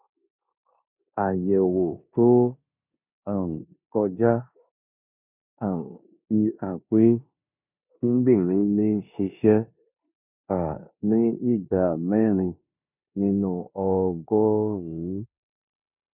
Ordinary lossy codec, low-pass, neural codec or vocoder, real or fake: none; 3.6 kHz; codec, 16 kHz in and 24 kHz out, 0.9 kbps, LongCat-Audio-Codec, four codebook decoder; fake